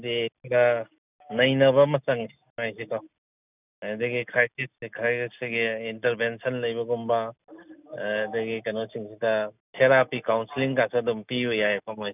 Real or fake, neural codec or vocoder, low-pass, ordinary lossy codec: real; none; 3.6 kHz; none